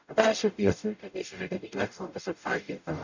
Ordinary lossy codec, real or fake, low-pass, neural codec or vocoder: none; fake; 7.2 kHz; codec, 44.1 kHz, 0.9 kbps, DAC